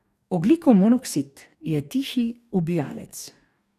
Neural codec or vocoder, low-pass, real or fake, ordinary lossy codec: codec, 44.1 kHz, 2.6 kbps, DAC; 14.4 kHz; fake; none